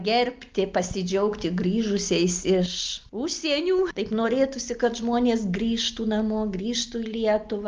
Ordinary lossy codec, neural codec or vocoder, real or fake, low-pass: Opus, 32 kbps; none; real; 7.2 kHz